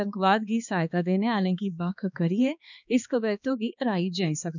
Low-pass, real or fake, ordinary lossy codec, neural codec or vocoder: 7.2 kHz; fake; none; codec, 16 kHz, 2 kbps, X-Codec, HuBERT features, trained on balanced general audio